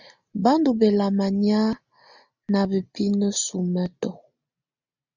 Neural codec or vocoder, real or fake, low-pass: none; real; 7.2 kHz